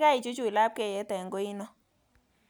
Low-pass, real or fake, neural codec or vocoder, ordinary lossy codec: none; real; none; none